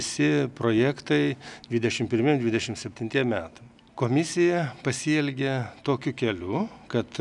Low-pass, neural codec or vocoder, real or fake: 10.8 kHz; none; real